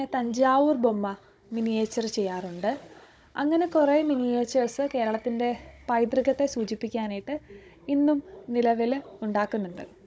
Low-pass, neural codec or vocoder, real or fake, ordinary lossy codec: none; codec, 16 kHz, 16 kbps, FunCodec, trained on Chinese and English, 50 frames a second; fake; none